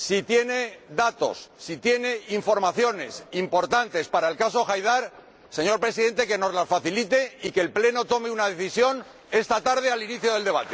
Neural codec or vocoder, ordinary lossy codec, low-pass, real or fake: none; none; none; real